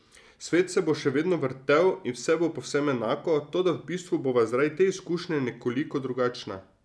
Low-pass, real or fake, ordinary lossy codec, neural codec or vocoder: none; real; none; none